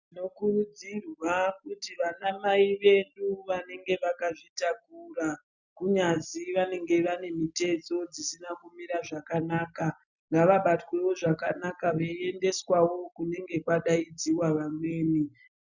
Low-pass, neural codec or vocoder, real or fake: 7.2 kHz; none; real